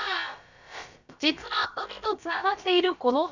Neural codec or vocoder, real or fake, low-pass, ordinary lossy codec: codec, 16 kHz, about 1 kbps, DyCAST, with the encoder's durations; fake; 7.2 kHz; Opus, 64 kbps